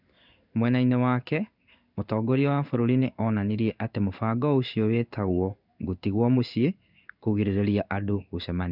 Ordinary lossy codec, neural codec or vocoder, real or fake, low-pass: none; codec, 16 kHz in and 24 kHz out, 1 kbps, XY-Tokenizer; fake; 5.4 kHz